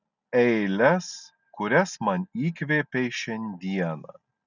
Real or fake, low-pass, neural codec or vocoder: real; 7.2 kHz; none